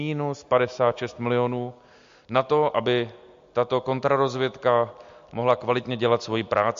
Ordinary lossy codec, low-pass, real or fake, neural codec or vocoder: MP3, 48 kbps; 7.2 kHz; real; none